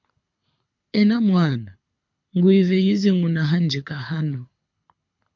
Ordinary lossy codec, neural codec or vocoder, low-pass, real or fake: MP3, 48 kbps; codec, 24 kHz, 6 kbps, HILCodec; 7.2 kHz; fake